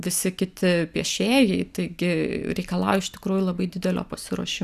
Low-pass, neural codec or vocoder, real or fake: 14.4 kHz; none; real